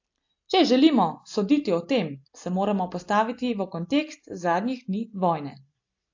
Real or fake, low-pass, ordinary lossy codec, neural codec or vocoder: real; 7.2 kHz; AAC, 48 kbps; none